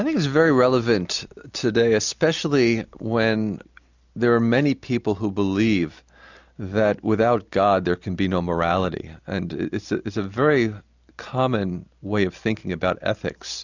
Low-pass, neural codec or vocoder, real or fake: 7.2 kHz; none; real